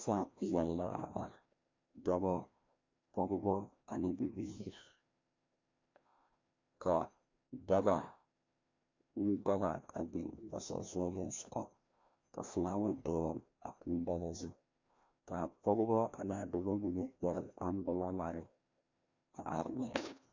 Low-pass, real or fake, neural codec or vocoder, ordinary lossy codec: 7.2 kHz; fake; codec, 16 kHz, 1 kbps, FreqCodec, larger model; MP3, 64 kbps